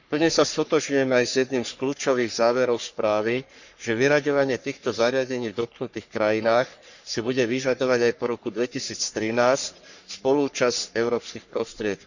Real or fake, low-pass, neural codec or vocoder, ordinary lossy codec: fake; 7.2 kHz; codec, 44.1 kHz, 3.4 kbps, Pupu-Codec; none